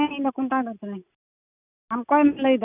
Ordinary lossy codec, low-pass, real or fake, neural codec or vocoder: none; 3.6 kHz; real; none